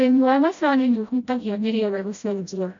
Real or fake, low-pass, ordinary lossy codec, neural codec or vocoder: fake; 7.2 kHz; AAC, 64 kbps; codec, 16 kHz, 0.5 kbps, FreqCodec, smaller model